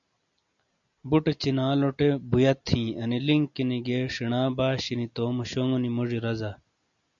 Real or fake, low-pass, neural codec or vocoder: real; 7.2 kHz; none